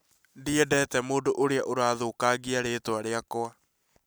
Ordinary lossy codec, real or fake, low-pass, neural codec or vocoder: none; real; none; none